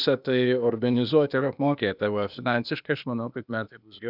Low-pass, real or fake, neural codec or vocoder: 5.4 kHz; fake; codec, 16 kHz, 0.8 kbps, ZipCodec